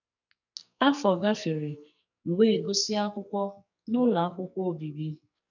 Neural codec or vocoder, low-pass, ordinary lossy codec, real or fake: codec, 44.1 kHz, 2.6 kbps, SNAC; 7.2 kHz; none; fake